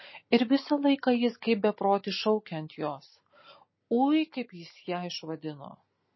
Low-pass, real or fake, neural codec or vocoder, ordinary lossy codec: 7.2 kHz; real; none; MP3, 24 kbps